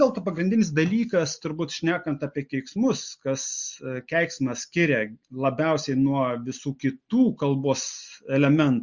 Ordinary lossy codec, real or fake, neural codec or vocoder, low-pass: Opus, 64 kbps; real; none; 7.2 kHz